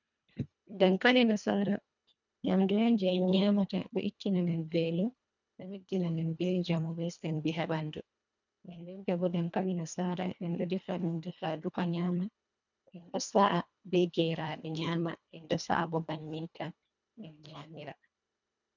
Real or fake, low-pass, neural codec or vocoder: fake; 7.2 kHz; codec, 24 kHz, 1.5 kbps, HILCodec